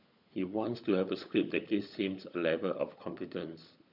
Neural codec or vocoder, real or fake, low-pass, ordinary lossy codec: codec, 16 kHz, 16 kbps, FunCodec, trained on LibriTTS, 50 frames a second; fake; 5.4 kHz; none